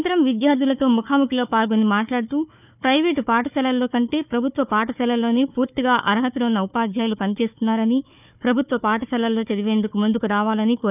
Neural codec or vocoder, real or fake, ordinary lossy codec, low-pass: codec, 16 kHz, 4 kbps, FunCodec, trained on Chinese and English, 50 frames a second; fake; none; 3.6 kHz